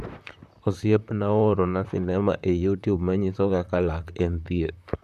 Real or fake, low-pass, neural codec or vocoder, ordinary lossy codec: fake; 14.4 kHz; vocoder, 44.1 kHz, 128 mel bands, Pupu-Vocoder; none